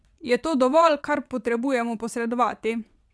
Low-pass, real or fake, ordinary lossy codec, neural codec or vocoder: none; fake; none; vocoder, 22.05 kHz, 80 mel bands, WaveNeXt